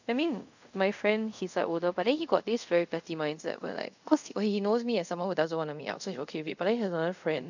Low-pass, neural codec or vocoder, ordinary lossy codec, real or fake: 7.2 kHz; codec, 24 kHz, 0.5 kbps, DualCodec; none; fake